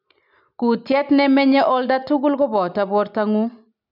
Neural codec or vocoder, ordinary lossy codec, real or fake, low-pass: none; none; real; 5.4 kHz